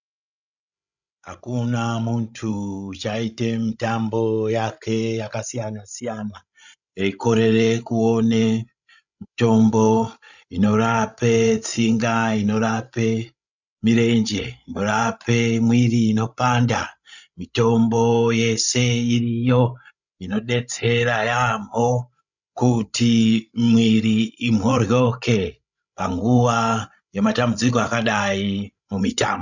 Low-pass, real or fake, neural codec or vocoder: 7.2 kHz; fake; codec, 16 kHz, 16 kbps, FreqCodec, larger model